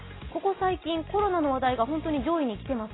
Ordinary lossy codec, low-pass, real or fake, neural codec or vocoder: AAC, 16 kbps; 7.2 kHz; real; none